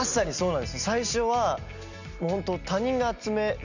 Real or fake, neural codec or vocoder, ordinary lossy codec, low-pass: real; none; none; 7.2 kHz